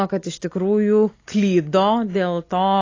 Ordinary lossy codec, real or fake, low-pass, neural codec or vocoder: AAC, 32 kbps; real; 7.2 kHz; none